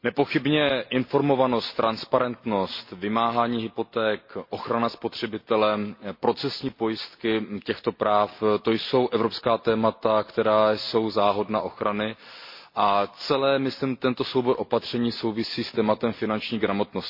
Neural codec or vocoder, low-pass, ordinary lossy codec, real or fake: none; 5.4 kHz; MP3, 32 kbps; real